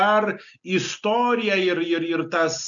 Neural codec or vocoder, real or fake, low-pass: none; real; 7.2 kHz